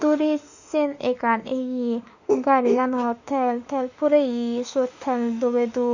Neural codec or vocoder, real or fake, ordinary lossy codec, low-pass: autoencoder, 48 kHz, 32 numbers a frame, DAC-VAE, trained on Japanese speech; fake; none; 7.2 kHz